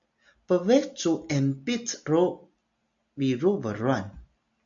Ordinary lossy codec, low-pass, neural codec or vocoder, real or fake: AAC, 64 kbps; 7.2 kHz; none; real